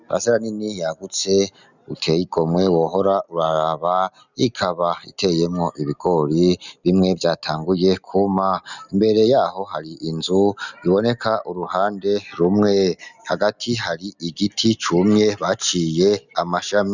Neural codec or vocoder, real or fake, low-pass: none; real; 7.2 kHz